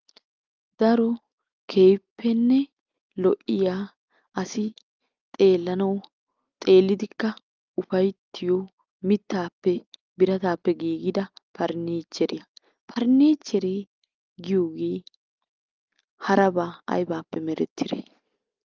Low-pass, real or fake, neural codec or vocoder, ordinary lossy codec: 7.2 kHz; real; none; Opus, 24 kbps